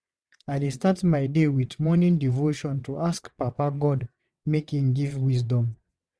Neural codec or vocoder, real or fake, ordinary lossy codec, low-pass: vocoder, 22.05 kHz, 80 mel bands, WaveNeXt; fake; none; none